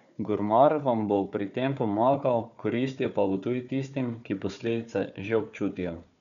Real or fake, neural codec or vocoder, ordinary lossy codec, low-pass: fake; codec, 16 kHz, 4 kbps, FunCodec, trained on Chinese and English, 50 frames a second; none; 7.2 kHz